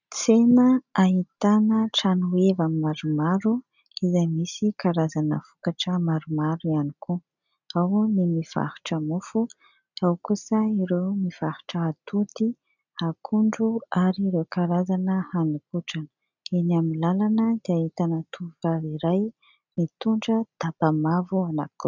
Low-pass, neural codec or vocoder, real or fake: 7.2 kHz; none; real